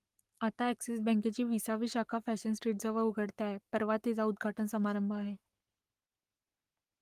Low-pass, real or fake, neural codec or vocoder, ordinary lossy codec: 14.4 kHz; fake; codec, 44.1 kHz, 7.8 kbps, Pupu-Codec; Opus, 32 kbps